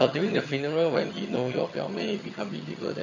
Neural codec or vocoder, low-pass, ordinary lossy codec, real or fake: vocoder, 22.05 kHz, 80 mel bands, HiFi-GAN; 7.2 kHz; none; fake